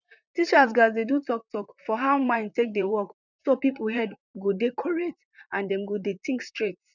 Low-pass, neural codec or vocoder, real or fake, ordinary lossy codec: 7.2 kHz; vocoder, 44.1 kHz, 128 mel bands, Pupu-Vocoder; fake; none